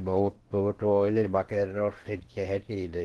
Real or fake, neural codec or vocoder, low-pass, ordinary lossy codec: fake; codec, 16 kHz in and 24 kHz out, 0.8 kbps, FocalCodec, streaming, 65536 codes; 10.8 kHz; Opus, 16 kbps